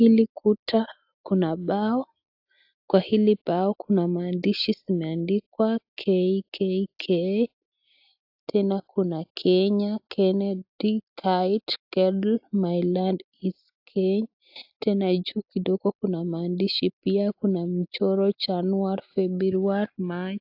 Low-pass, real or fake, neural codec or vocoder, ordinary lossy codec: 5.4 kHz; real; none; AAC, 48 kbps